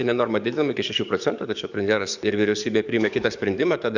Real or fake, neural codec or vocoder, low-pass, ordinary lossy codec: fake; codec, 44.1 kHz, 7.8 kbps, DAC; 7.2 kHz; Opus, 64 kbps